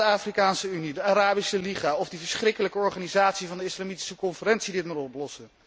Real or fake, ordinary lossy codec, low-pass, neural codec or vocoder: real; none; none; none